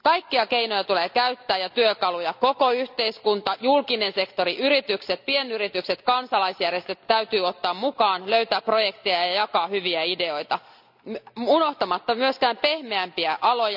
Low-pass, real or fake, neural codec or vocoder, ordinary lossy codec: 5.4 kHz; real; none; none